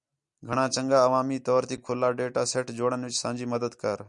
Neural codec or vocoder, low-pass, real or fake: none; 10.8 kHz; real